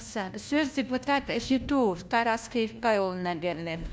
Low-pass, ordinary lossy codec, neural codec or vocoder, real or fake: none; none; codec, 16 kHz, 1 kbps, FunCodec, trained on LibriTTS, 50 frames a second; fake